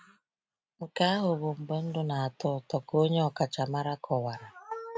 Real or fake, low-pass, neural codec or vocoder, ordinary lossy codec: real; none; none; none